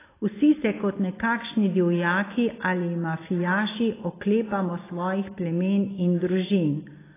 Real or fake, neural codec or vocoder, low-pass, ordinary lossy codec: real; none; 3.6 kHz; AAC, 16 kbps